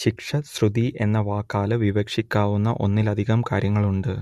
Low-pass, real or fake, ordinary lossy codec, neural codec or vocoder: 14.4 kHz; real; MP3, 64 kbps; none